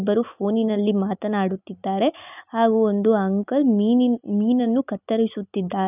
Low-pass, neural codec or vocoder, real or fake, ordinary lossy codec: 3.6 kHz; none; real; none